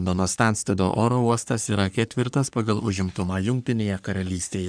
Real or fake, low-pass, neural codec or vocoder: fake; 9.9 kHz; codec, 44.1 kHz, 3.4 kbps, Pupu-Codec